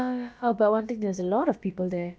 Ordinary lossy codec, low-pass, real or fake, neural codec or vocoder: none; none; fake; codec, 16 kHz, about 1 kbps, DyCAST, with the encoder's durations